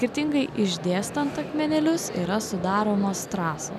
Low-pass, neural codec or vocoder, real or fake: 14.4 kHz; none; real